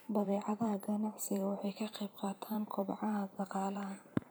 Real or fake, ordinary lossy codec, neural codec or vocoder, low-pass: fake; none; vocoder, 44.1 kHz, 128 mel bands every 512 samples, BigVGAN v2; none